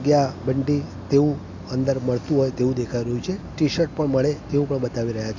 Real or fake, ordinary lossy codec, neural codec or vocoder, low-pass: real; MP3, 64 kbps; none; 7.2 kHz